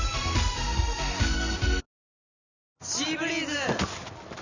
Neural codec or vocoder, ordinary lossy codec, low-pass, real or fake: none; none; 7.2 kHz; real